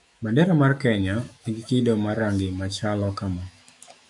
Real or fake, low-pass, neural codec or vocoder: fake; 10.8 kHz; autoencoder, 48 kHz, 128 numbers a frame, DAC-VAE, trained on Japanese speech